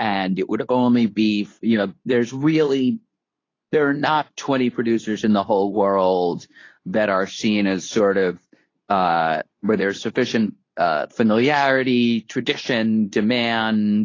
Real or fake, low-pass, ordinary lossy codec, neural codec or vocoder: fake; 7.2 kHz; AAC, 32 kbps; codec, 24 kHz, 0.9 kbps, WavTokenizer, medium speech release version 2